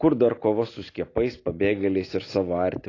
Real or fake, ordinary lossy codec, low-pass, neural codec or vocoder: real; AAC, 32 kbps; 7.2 kHz; none